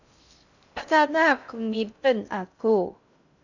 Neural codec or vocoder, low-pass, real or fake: codec, 16 kHz in and 24 kHz out, 0.6 kbps, FocalCodec, streaming, 2048 codes; 7.2 kHz; fake